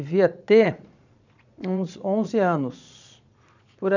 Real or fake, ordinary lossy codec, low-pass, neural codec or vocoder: fake; none; 7.2 kHz; vocoder, 22.05 kHz, 80 mel bands, Vocos